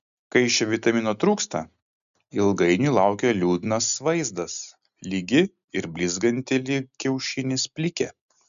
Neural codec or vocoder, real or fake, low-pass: none; real; 7.2 kHz